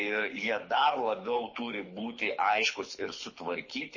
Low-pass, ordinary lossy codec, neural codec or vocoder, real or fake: 7.2 kHz; MP3, 32 kbps; codec, 24 kHz, 6 kbps, HILCodec; fake